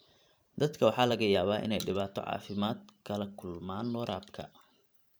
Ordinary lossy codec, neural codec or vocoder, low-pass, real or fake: none; vocoder, 44.1 kHz, 128 mel bands every 512 samples, BigVGAN v2; none; fake